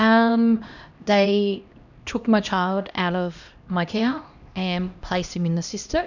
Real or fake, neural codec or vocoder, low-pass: fake; codec, 16 kHz, 1 kbps, X-Codec, HuBERT features, trained on LibriSpeech; 7.2 kHz